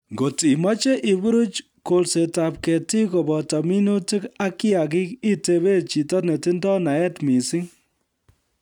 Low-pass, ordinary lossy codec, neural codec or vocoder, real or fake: 19.8 kHz; none; none; real